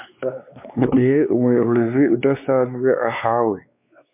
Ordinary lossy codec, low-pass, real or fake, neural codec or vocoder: MP3, 32 kbps; 3.6 kHz; fake; codec, 16 kHz, 2 kbps, X-Codec, WavLM features, trained on Multilingual LibriSpeech